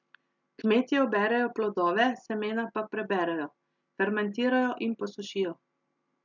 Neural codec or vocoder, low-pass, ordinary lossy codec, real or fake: none; 7.2 kHz; none; real